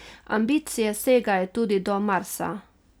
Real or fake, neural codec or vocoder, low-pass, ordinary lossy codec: real; none; none; none